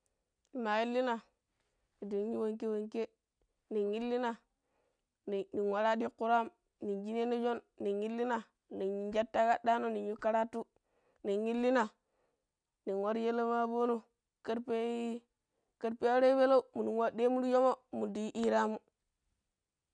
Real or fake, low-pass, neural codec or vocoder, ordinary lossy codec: real; 9.9 kHz; none; none